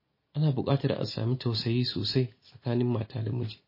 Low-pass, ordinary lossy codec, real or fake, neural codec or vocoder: 5.4 kHz; MP3, 24 kbps; real; none